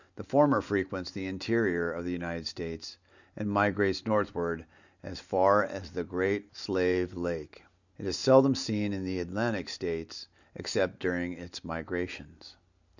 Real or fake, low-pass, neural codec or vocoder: real; 7.2 kHz; none